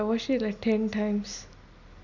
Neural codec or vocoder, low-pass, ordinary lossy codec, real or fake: none; 7.2 kHz; none; real